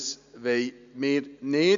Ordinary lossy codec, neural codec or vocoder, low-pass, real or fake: none; none; 7.2 kHz; real